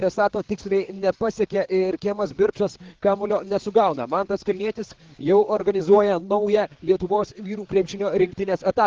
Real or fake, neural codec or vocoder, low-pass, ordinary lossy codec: fake; codec, 16 kHz, 4 kbps, FreqCodec, larger model; 7.2 kHz; Opus, 16 kbps